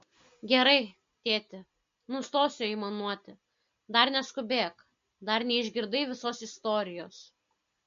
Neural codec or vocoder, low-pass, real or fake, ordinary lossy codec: none; 7.2 kHz; real; MP3, 48 kbps